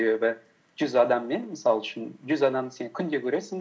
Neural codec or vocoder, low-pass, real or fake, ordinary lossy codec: none; none; real; none